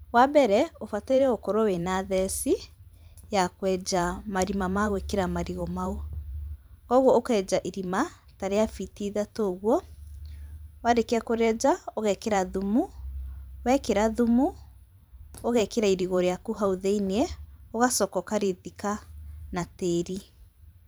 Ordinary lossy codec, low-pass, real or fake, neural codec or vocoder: none; none; fake; vocoder, 44.1 kHz, 128 mel bands every 512 samples, BigVGAN v2